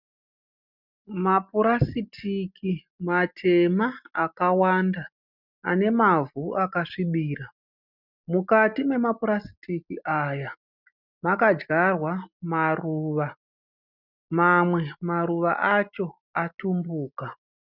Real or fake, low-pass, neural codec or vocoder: real; 5.4 kHz; none